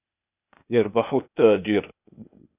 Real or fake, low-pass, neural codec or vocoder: fake; 3.6 kHz; codec, 16 kHz, 0.8 kbps, ZipCodec